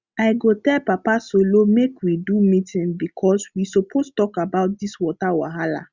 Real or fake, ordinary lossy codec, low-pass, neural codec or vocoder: real; Opus, 64 kbps; 7.2 kHz; none